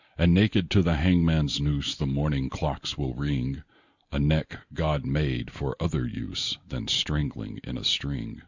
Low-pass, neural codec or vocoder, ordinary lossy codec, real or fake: 7.2 kHz; none; Opus, 64 kbps; real